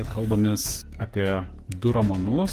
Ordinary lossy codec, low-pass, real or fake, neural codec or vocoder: Opus, 24 kbps; 14.4 kHz; fake; codec, 44.1 kHz, 2.6 kbps, SNAC